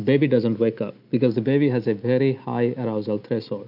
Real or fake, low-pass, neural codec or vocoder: real; 5.4 kHz; none